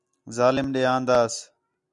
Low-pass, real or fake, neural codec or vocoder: 10.8 kHz; real; none